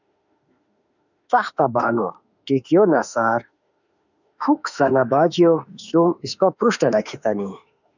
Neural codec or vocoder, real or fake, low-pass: autoencoder, 48 kHz, 32 numbers a frame, DAC-VAE, trained on Japanese speech; fake; 7.2 kHz